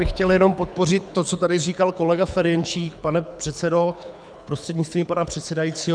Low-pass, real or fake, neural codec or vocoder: 9.9 kHz; fake; codec, 24 kHz, 6 kbps, HILCodec